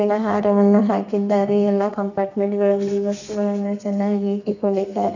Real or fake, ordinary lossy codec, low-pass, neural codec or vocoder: fake; none; 7.2 kHz; codec, 32 kHz, 1.9 kbps, SNAC